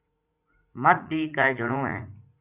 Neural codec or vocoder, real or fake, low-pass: vocoder, 44.1 kHz, 80 mel bands, Vocos; fake; 3.6 kHz